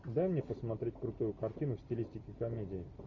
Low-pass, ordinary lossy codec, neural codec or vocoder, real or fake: 7.2 kHz; MP3, 48 kbps; none; real